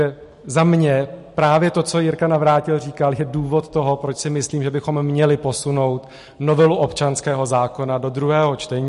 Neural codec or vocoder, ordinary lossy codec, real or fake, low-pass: none; MP3, 48 kbps; real; 14.4 kHz